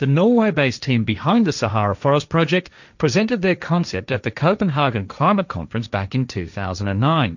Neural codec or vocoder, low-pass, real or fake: codec, 16 kHz, 1.1 kbps, Voila-Tokenizer; 7.2 kHz; fake